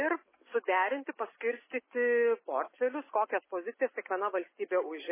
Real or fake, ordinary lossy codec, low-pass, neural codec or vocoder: real; MP3, 16 kbps; 3.6 kHz; none